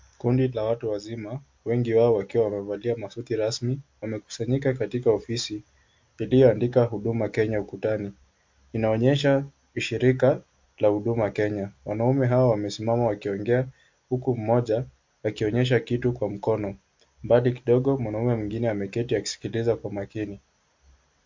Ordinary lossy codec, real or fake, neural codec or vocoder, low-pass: MP3, 48 kbps; real; none; 7.2 kHz